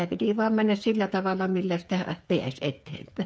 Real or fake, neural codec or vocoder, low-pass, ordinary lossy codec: fake; codec, 16 kHz, 8 kbps, FreqCodec, smaller model; none; none